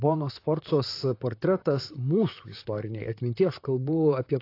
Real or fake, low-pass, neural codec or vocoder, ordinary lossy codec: fake; 5.4 kHz; vocoder, 44.1 kHz, 128 mel bands, Pupu-Vocoder; AAC, 32 kbps